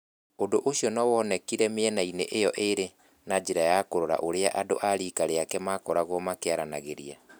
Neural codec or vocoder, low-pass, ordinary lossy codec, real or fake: none; none; none; real